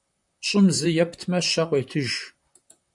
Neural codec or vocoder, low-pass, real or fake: vocoder, 44.1 kHz, 128 mel bands, Pupu-Vocoder; 10.8 kHz; fake